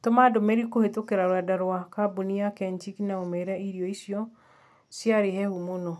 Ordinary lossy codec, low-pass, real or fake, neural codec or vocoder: none; none; real; none